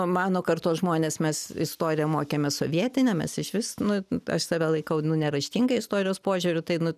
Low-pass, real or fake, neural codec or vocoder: 14.4 kHz; real; none